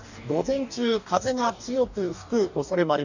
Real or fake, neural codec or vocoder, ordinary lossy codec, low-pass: fake; codec, 44.1 kHz, 2.6 kbps, DAC; none; 7.2 kHz